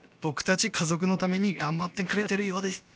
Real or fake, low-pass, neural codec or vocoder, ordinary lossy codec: fake; none; codec, 16 kHz, about 1 kbps, DyCAST, with the encoder's durations; none